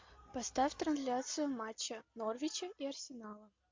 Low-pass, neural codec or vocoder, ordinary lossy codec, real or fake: 7.2 kHz; none; MP3, 48 kbps; real